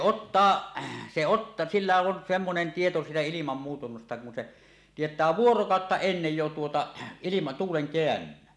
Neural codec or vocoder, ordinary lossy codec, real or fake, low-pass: none; none; real; none